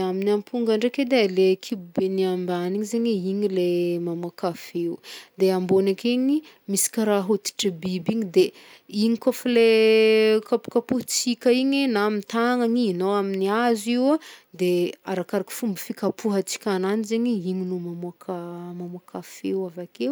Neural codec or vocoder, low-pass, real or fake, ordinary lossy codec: none; none; real; none